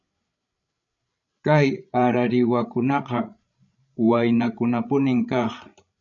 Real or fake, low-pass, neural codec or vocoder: fake; 7.2 kHz; codec, 16 kHz, 16 kbps, FreqCodec, larger model